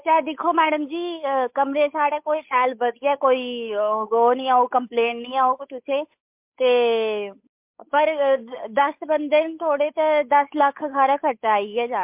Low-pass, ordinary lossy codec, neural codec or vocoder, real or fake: 3.6 kHz; MP3, 32 kbps; codec, 16 kHz, 8 kbps, FunCodec, trained on Chinese and English, 25 frames a second; fake